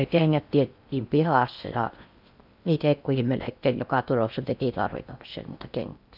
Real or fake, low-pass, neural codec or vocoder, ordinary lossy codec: fake; 5.4 kHz; codec, 16 kHz in and 24 kHz out, 0.6 kbps, FocalCodec, streaming, 4096 codes; none